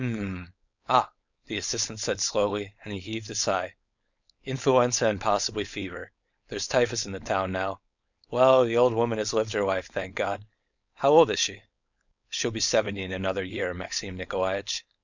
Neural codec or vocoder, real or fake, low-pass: codec, 16 kHz, 4.8 kbps, FACodec; fake; 7.2 kHz